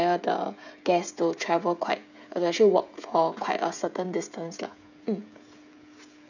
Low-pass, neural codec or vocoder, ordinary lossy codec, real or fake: 7.2 kHz; codec, 16 kHz, 16 kbps, FreqCodec, smaller model; none; fake